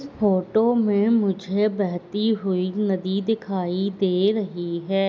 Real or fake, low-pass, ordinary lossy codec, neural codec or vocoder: real; none; none; none